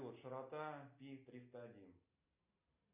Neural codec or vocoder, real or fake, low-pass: none; real; 3.6 kHz